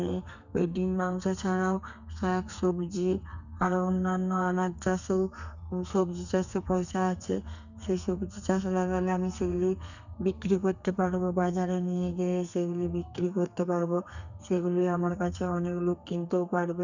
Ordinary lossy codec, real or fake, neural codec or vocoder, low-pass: none; fake; codec, 32 kHz, 1.9 kbps, SNAC; 7.2 kHz